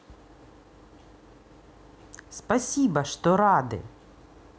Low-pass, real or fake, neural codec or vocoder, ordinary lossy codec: none; real; none; none